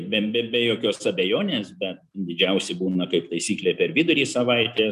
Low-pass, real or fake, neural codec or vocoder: 14.4 kHz; real; none